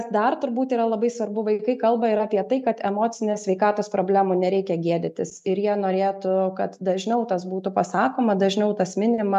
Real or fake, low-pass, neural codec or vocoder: real; 14.4 kHz; none